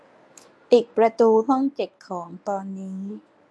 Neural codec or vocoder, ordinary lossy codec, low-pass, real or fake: codec, 24 kHz, 0.9 kbps, WavTokenizer, medium speech release version 1; none; none; fake